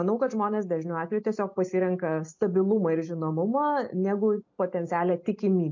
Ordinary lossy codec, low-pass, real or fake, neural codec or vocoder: MP3, 48 kbps; 7.2 kHz; real; none